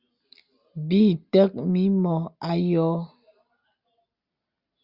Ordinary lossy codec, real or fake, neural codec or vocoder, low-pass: Opus, 64 kbps; real; none; 5.4 kHz